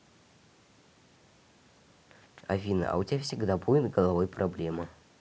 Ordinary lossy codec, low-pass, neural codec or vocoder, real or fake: none; none; none; real